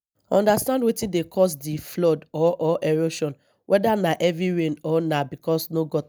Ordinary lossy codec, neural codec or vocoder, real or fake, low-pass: none; none; real; none